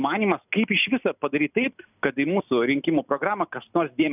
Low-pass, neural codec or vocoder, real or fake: 3.6 kHz; none; real